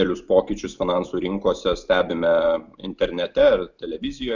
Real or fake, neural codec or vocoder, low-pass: real; none; 7.2 kHz